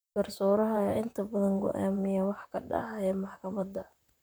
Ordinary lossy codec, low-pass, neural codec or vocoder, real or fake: none; none; vocoder, 44.1 kHz, 128 mel bands, Pupu-Vocoder; fake